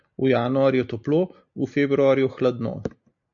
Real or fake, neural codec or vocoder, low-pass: real; none; 7.2 kHz